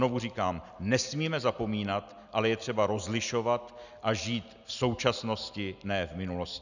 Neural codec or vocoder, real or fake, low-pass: none; real; 7.2 kHz